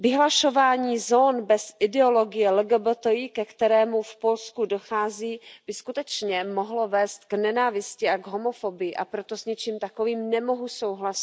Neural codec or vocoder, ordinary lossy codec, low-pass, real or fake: none; none; none; real